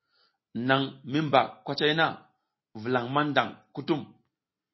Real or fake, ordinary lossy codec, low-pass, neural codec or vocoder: real; MP3, 24 kbps; 7.2 kHz; none